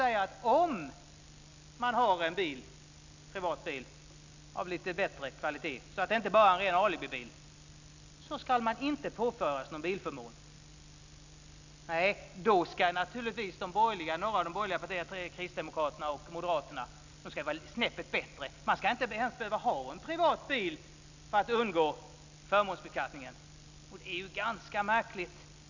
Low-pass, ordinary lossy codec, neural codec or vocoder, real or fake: 7.2 kHz; none; none; real